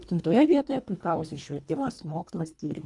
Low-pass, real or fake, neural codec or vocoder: 10.8 kHz; fake; codec, 24 kHz, 1.5 kbps, HILCodec